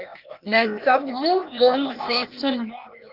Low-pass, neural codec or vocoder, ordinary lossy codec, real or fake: 5.4 kHz; codec, 16 kHz, 0.8 kbps, ZipCodec; Opus, 32 kbps; fake